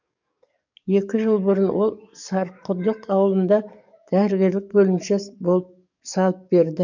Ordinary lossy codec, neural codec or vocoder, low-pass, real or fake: none; codec, 44.1 kHz, 7.8 kbps, DAC; 7.2 kHz; fake